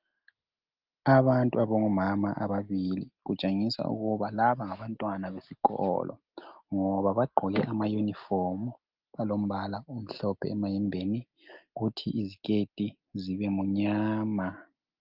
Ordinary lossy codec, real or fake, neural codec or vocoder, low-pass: Opus, 32 kbps; real; none; 5.4 kHz